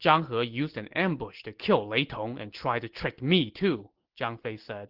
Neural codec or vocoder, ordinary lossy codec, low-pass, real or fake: none; Opus, 16 kbps; 5.4 kHz; real